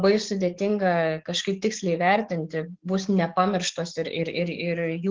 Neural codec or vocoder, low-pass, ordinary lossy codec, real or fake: codec, 44.1 kHz, 7.8 kbps, DAC; 7.2 kHz; Opus, 16 kbps; fake